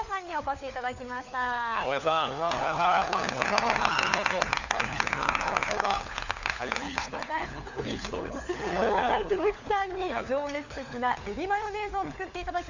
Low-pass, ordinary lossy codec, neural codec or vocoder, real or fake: 7.2 kHz; none; codec, 16 kHz, 4 kbps, FunCodec, trained on LibriTTS, 50 frames a second; fake